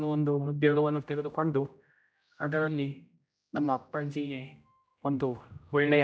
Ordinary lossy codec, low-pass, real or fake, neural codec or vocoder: none; none; fake; codec, 16 kHz, 0.5 kbps, X-Codec, HuBERT features, trained on general audio